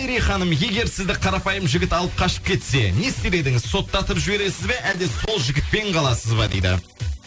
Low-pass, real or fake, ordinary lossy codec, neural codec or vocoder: none; real; none; none